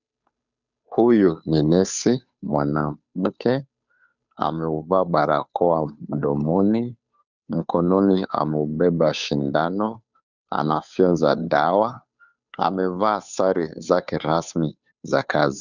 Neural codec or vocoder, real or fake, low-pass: codec, 16 kHz, 2 kbps, FunCodec, trained on Chinese and English, 25 frames a second; fake; 7.2 kHz